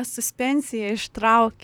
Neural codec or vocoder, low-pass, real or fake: vocoder, 44.1 kHz, 128 mel bands, Pupu-Vocoder; 19.8 kHz; fake